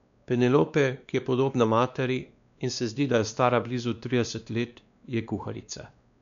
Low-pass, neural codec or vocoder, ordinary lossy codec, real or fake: 7.2 kHz; codec, 16 kHz, 2 kbps, X-Codec, WavLM features, trained on Multilingual LibriSpeech; none; fake